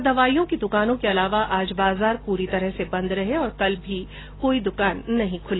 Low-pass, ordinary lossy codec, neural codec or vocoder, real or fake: 7.2 kHz; AAC, 16 kbps; none; real